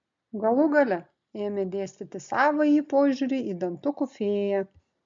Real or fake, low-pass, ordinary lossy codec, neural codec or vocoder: real; 7.2 kHz; MP3, 64 kbps; none